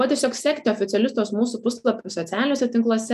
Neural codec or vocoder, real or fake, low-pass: none; real; 14.4 kHz